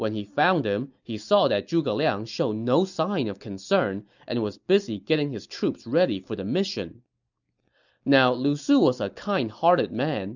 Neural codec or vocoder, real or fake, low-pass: none; real; 7.2 kHz